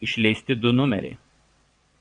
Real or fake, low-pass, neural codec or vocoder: fake; 9.9 kHz; vocoder, 22.05 kHz, 80 mel bands, WaveNeXt